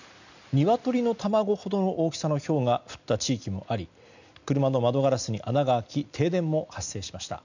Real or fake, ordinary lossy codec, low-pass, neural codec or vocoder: real; none; 7.2 kHz; none